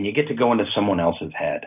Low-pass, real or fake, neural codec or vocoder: 3.6 kHz; real; none